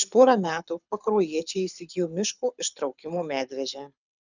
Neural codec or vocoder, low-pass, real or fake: codec, 24 kHz, 6 kbps, HILCodec; 7.2 kHz; fake